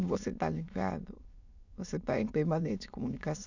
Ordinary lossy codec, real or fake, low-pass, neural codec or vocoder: none; fake; 7.2 kHz; autoencoder, 22.05 kHz, a latent of 192 numbers a frame, VITS, trained on many speakers